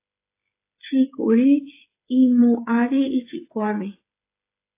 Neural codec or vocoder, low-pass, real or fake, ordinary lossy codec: codec, 16 kHz, 8 kbps, FreqCodec, smaller model; 3.6 kHz; fake; AAC, 24 kbps